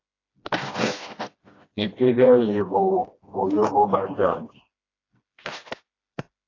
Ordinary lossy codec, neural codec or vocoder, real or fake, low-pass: AAC, 32 kbps; codec, 16 kHz, 1 kbps, FreqCodec, smaller model; fake; 7.2 kHz